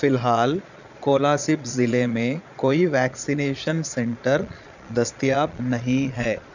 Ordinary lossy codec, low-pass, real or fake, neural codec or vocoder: none; 7.2 kHz; fake; vocoder, 22.05 kHz, 80 mel bands, Vocos